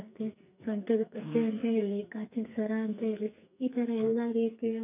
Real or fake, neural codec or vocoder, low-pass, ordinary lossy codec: fake; codec, 44.1 kHz, 2.6 kbps, SNAC; 3.6 kHz; none